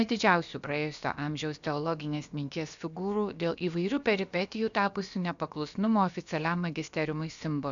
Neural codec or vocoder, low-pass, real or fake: codec, 16 kHz, about 1 kbps, DyCAST, with the encoder's durations; 7.2 kHz; fake